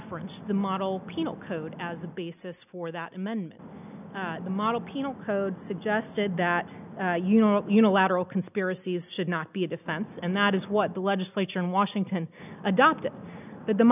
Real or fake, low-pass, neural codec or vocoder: real; 3.6 kHz; none